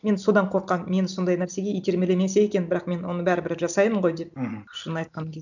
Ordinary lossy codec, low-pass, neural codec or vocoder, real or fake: none; 7.2 kHz; none; real